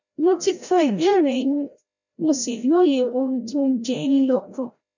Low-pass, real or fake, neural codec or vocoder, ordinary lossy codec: 7.2 kHz; fake; codec, 16 kHz, 0.5 kbps, FreqCodec, larger model; none